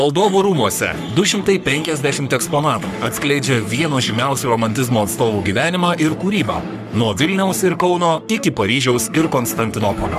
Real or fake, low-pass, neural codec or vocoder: fake; 14.4 kHz; codec, 44.1 kHz, 3.4 kbps, Pupu-Codec